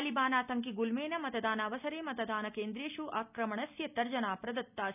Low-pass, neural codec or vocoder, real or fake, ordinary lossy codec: 3.6 kHz; none; real; none